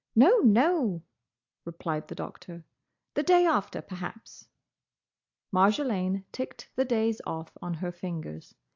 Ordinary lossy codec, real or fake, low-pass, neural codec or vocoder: AAC, 48 kbps; real; 7.2 kHz; none